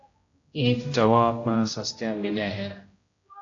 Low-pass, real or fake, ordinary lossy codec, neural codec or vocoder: 7.2 kHz; fake; AAC, 48 kbps; codec, 16 kHz, 0.5 kbps, X-Codec, HuBERT features, trained on general audio